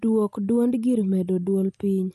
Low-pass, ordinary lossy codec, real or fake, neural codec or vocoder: 14.4 kHz; none; real; none